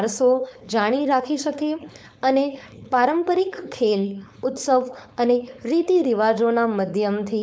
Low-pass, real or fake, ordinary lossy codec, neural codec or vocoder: none; fake; none; codec, 16 kHz, 4.8 kbps, FACodec